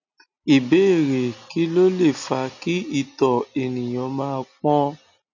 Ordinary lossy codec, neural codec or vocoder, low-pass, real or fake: none; none; 7.2 kHz; real